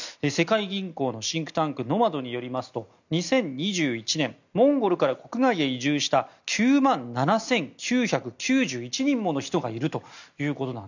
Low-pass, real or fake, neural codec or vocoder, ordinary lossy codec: 7.2 kHz; real; none; none